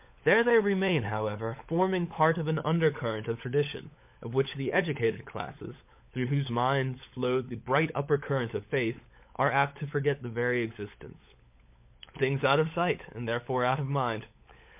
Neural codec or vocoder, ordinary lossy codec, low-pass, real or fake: codec, 16 kHz, 16 kbps, FunCodec, trained on LibriTTS, 50 frames a second; MP3, 32 kbps; 3.6 kHz; fake